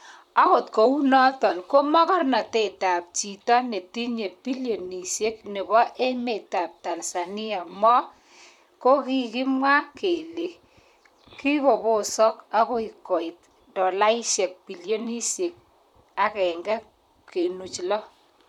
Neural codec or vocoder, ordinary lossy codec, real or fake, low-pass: vocoder, 44.1 kHz, 128 mel bands, Pupu-Vocoder; none; fake; 19.8 kHz